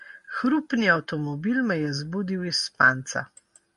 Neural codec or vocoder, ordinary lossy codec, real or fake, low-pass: none; MP3, 48 kbps; real; 14.4 kHz